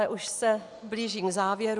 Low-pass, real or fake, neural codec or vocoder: 10.8 kHz; real; none